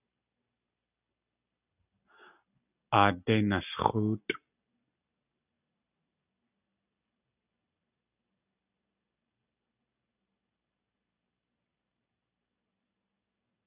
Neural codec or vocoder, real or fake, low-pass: none; real; 3.6 kHz